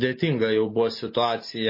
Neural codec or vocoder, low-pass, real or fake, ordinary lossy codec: none; 5.4 kHz; real; MP3, 24 kbps